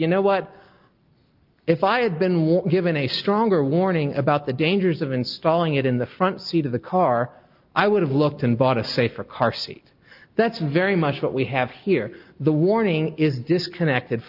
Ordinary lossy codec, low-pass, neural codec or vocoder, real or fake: Opus, 24 kbps; 5.4 kHz; none; real